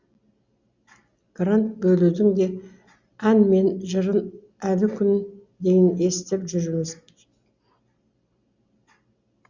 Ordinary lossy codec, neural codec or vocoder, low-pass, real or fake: Opus, 64 kbps; none; 7.2 kHz; real